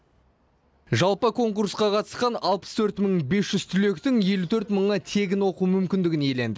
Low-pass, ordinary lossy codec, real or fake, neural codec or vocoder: none; none; real; none